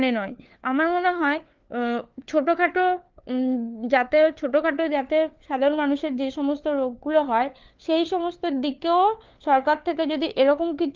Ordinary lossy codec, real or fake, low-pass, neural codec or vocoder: Opus, 24 kbps; fake; 7.2 kHz; codec, 16 kHz, 4 kbps, FunCodec, trained on LibriTTS, 50 frames a second